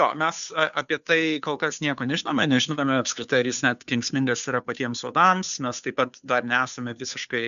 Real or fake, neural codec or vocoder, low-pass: fake; codec, 16 kHz, 2 kbps, FunCodec, trained on Chinese and English, 25 frames a second; 7.2 kHz